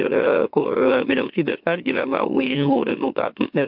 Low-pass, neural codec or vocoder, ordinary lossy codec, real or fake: 5.4 kHz; autoencoder, 44.1 kHz, a latent of 192 numbers a frame, MeloTTS; MP3, 48 kbps; fake